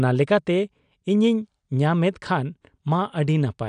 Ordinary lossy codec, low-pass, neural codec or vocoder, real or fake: none; 9.9 kHz; none; real